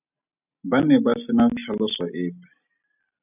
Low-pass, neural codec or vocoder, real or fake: 3.6 kHz; none; real